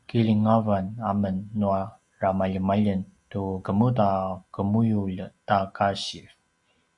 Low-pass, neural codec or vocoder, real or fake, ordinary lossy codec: 10.8 kHz; none; real; Opus, 64 kbps